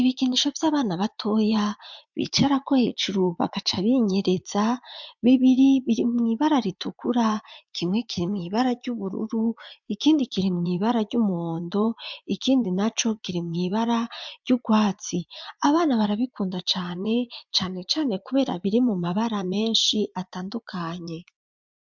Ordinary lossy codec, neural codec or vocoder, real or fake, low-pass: MP3, 64 kbps; vocoder, 44.1 kHz, 80 mel bands, Vocos; fake; 7.2 kHz